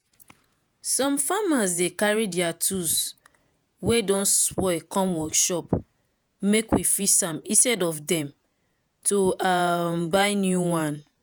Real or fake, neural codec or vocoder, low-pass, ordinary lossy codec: fake; vocoder, 48 kHz, 128 mel bands, Vocos; none; none